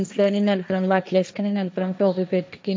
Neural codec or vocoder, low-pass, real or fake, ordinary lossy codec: codec, 16 kHz, 1.1 kbps, Voila-Tokenizer; none; fake; none